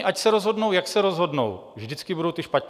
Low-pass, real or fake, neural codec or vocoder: 14.4 kHz; real; none